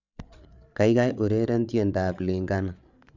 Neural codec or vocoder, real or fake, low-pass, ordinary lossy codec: codec, 16 kHz, 8 kbps, FreqCodec, larger model; fake; 7.2 kHz; none